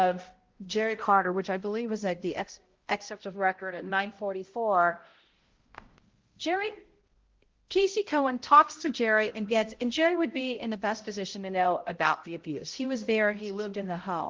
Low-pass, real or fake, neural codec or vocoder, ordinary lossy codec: 7.2 kHz; fake; codec, 16 kHz, 0.5 kbps, X-Codec, HuBERT features, trained on balanced general audio; Opus, 16 kbps